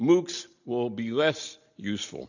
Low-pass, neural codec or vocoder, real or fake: 7.2 kHz; none; real